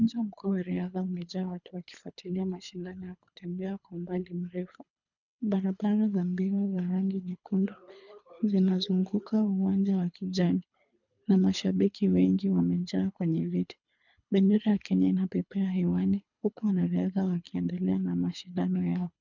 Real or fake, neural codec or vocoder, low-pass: fake; codec, 24 kHz, 3 kbps, HILCodec; 7.2 kHz